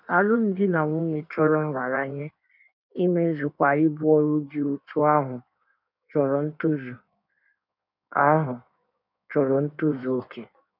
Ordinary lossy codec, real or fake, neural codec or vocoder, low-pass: none; fake; codec, 16 kHz in and 24 kHz out, 1.1 kbps, FireRedTTS-2 codec; 5.4 kHz